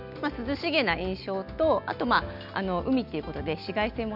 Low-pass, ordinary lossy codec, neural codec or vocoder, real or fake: 5.4 kHz; none; none; real